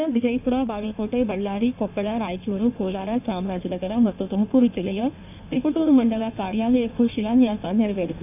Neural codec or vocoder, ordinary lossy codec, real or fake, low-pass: codec, 16 kHz in and 24 kHz out, 1.1 kbps, FireRedTTS-2 codec; none; fake; 3.6 kHz